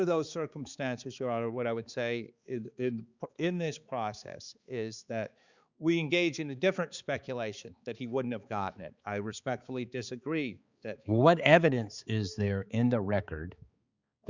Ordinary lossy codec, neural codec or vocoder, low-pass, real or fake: Opus, 64 kbps; codec, 16 kHz, 4 kbps, X-Codec, HuBERT features, trained on balanced general audio; 7.2 kHz; fake